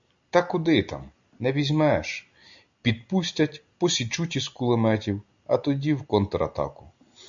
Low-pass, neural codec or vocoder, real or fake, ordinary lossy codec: 7.2 kHz; none; real; MP3, 64 kbps